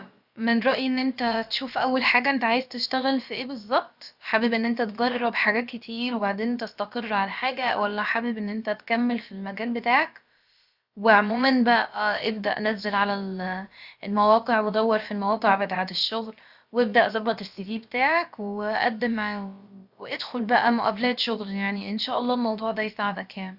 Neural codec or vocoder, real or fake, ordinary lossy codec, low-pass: codec, 16 kHz, about 1 kbps, DyCAST, with the encoder's durations; fake; Opus, 64 kbps; 5.4 kHz